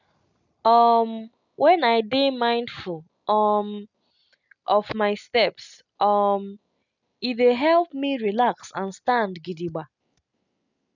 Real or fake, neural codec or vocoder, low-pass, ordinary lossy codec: real; none; 7.2 kHz; none